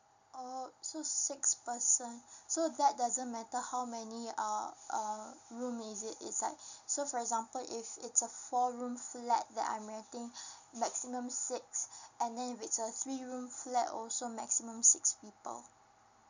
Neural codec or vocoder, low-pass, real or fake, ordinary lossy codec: none; 7.2 kHz; real; none